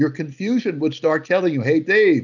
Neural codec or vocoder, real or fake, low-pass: none; real; 7.2 kHz